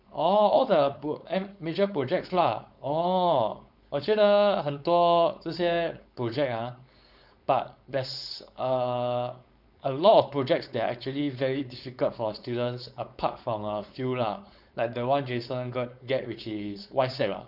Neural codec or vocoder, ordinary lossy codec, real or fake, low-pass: codec, 16 kHz, 4.8 kbps, FACodec; none; fake; 5.4 kHz